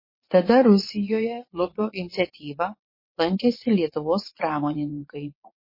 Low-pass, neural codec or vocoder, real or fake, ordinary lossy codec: 5.4 kHz; vocoder, 22.05 kHz, 80 mel bands, WaveNeXt; fake; MP3, 24 kbps